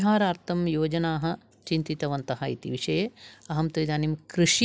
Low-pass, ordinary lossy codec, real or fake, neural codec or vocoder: none; none; real; none